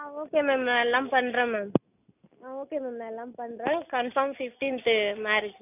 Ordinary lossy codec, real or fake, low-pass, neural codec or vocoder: none; real; 3.6 kHz; none